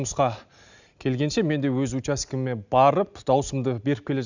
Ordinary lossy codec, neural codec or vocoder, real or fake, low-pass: none; none; real; 7.2 kHz